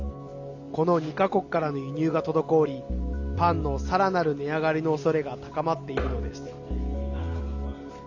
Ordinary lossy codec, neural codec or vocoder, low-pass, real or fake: none; none; 7.2 kHz; real